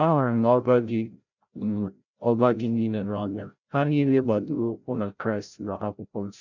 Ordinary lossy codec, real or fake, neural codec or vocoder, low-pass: none; fake; codec, 16 kHz, 0.5 kbps, FreqCodec, larger model; 7.2 kHz